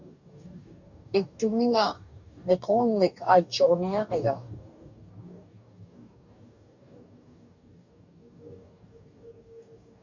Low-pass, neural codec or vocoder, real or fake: 7.2 kHz; codec, 44.1 kHz, 2.6 kbps, DAC; fake